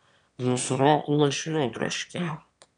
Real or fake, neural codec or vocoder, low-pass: fake; autoencoder, 22.05 kHz, a latent of 192 numbers a frame, VITS, trained on one speaker; 9.9 kHz